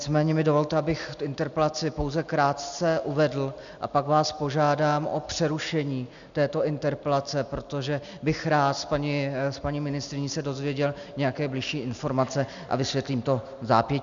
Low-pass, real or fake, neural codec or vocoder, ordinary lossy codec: 7.2 kHz; real; none; MP3, 96 kbps